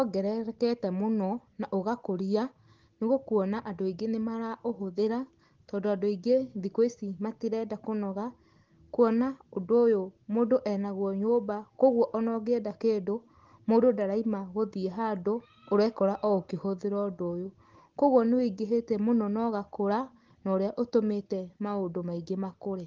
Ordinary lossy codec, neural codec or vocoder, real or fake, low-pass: Opus, 16 kbps; none; real; 7.2 kHz